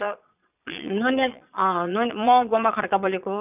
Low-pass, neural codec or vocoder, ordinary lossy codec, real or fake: 3.6 kHz; vocoder, 22.05 kHz, 80 mel bands, Vocos; none; fake